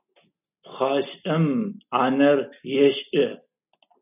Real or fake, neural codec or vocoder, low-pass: real; none; 3.6 kHz